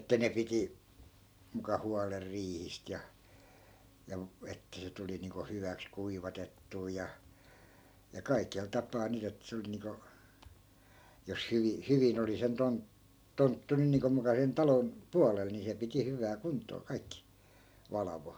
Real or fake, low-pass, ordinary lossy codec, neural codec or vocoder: real; none; none; none